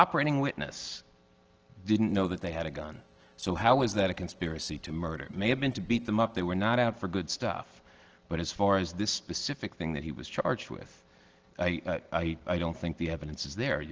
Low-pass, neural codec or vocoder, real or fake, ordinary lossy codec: 7.2 kHz; none; real; Opus, 16 kbps